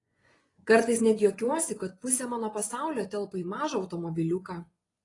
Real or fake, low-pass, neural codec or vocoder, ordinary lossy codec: fake; 10.8 kHz; vocoder, 24 kHz, 100 mel bands, Vocos; AAC, 32 kbps